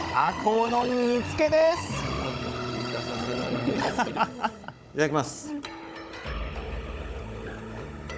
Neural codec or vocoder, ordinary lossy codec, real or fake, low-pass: codec, 16 kHz, 16 kbps, FunCodec, trained on Chinese and English, 50 frames a second; none; fake; none